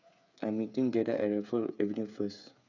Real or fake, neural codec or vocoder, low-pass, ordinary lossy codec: fake; codec, 16 kHz, 8 kbps, FreqCodec, smaller model; 7.2 kHz; none